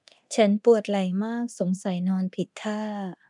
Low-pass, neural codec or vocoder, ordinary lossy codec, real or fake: 10.8 kHz; codec, 24 kHz, 0.9 kbps, DualCodec; none; fake